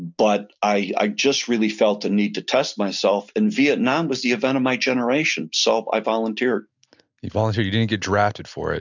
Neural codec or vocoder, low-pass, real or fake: none; 7.2 kHz; real